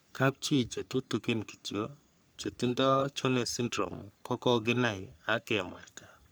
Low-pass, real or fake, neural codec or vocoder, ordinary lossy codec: none; fake; codec, 44.1 kHz, 3.4 kbps, Pupu-Codec; none